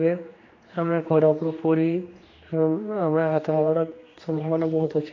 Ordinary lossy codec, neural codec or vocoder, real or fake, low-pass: AAC, 32 kbps; codec, 16 kHz, 2 kbps, X-Codec, HuBERT features, trained on general audio; fake; 7.2 kHz